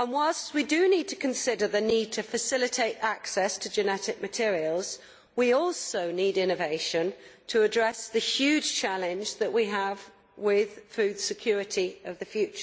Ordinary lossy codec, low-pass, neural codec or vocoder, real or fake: none; none; none; real